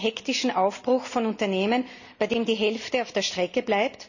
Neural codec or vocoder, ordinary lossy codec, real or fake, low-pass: none; none; real; 7.2 kHz